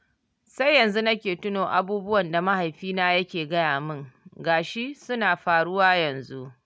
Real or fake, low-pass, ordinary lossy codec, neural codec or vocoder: real; none; none; none